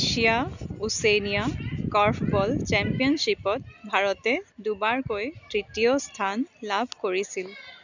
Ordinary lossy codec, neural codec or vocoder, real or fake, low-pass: none; none; real; 7.2 kHz